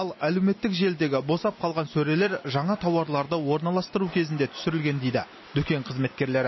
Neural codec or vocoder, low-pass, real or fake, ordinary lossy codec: none; 7.2 kHz; real; MP3, 24 kbps